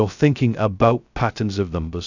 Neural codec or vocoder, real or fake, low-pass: codec, 16 kHz, 0.2 kbps, FocalCodec; fake; 7.2 kHz